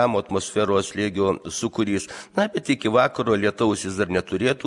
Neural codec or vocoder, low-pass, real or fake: none; 10.8 kHz; real